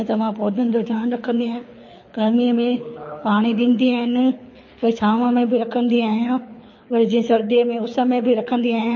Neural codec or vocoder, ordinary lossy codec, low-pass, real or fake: codec, 24 kHz, 6 kbps, HILCodec; MP3, 32 kbps; 7.2 kHz; fake